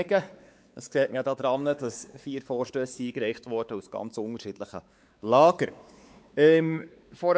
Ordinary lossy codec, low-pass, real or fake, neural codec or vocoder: none; none; fake; codec, 16 kHz, 4 kbps, X-Codec, WavLM features, trained on Multilingual LibriSpeech